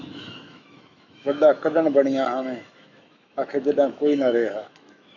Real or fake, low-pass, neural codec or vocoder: fake; 7.2 kHz; autoencoder, 48 kHz, 128 numbers a frame, DAC-VAE, trained on Japanese speech